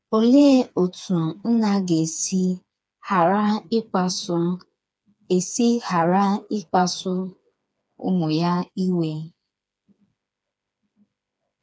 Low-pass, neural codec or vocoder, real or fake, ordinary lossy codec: none; codec, 16 kHz, 4 kbps, FreqCodec, smaller model; fake; none